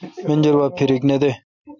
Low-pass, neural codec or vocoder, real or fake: 7.2 kHz; none; real